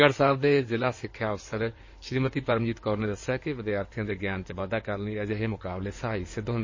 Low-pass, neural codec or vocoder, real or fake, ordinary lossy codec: 7.2 kHz; codec, 16 kHz in and 24 kHz out, 2.2 kbps, FireRedTTS-2 codec; fake; MP3, 32 kbps